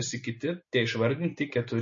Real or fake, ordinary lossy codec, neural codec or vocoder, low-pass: real; MP3, 32 kbps; none; 7.2 kHz